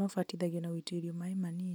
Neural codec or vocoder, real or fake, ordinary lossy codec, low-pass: none; real; none; none